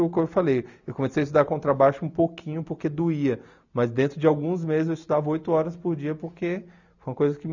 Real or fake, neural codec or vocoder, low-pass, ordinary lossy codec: real; none; 7.2 kHz; none